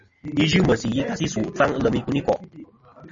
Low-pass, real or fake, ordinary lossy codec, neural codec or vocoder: 7.2 kHz; real; MP3, 32 kbps; none